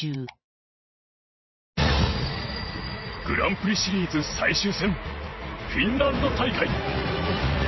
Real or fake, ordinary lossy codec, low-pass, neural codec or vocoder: fake; MP3, 24 kbps; 7.2 kHz; vocoder, 44.1 kHz, 80 mel bands, Vocos